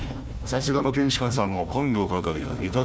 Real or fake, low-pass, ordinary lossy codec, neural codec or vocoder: fake; none; none; codec, 16 kHz, 1 kbps, FunCodec, trained on Chinese and English, 50 frames a second